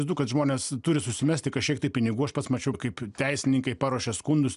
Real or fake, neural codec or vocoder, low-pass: real; none; 10.8 kHz